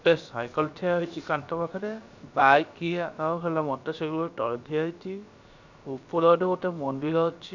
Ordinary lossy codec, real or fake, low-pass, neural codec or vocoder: none; fake; 7.2 kHz; codec, 16 kHz, about 1 kbps, DyCAST, with the encoder's durations